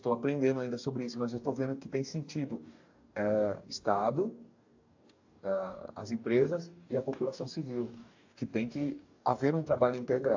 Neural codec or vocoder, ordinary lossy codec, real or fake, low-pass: codec, 44.1 kHz, 2.6 kbps, DAC; AAC, 48 kbps; fake; 7.2 kHz